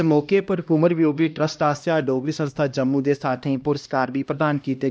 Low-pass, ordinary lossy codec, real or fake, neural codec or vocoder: none; none; fake; codec, 16 kHz, 1 kbps, X-Codec, HuBERT features, trained on LibriSpeech